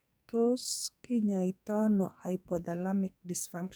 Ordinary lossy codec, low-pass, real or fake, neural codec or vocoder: none; none; fake; codec, 44.1 kHz, 2.6 kbps, SNAC